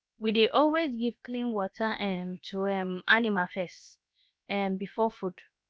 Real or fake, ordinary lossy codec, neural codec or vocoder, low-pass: fake; none; codec, 16 kHz, about 1 kbps, DyCAST, with the encoder's durations; none